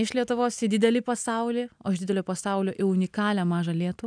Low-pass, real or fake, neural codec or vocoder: 9.9 kHz; real; none